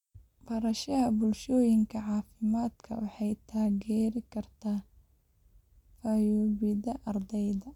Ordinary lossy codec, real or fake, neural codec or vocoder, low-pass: none; real; none; 19.8 kHz